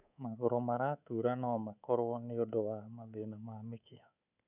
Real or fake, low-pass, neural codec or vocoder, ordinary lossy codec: fake; 3.6 kHz; codec, 24 kHz, 3.1 kbps, DualCodec; none